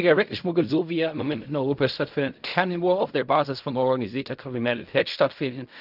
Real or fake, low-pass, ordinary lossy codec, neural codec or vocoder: fake; 5.4 kHz; none; codec, 16 kHz in and 24 kHz out, 0.4 kbps, LongCat-Audio-Codec, fine tuned four codebook decoder